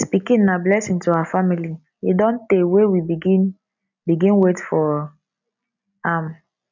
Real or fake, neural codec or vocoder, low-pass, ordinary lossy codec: real; none; 7.2 kHz; none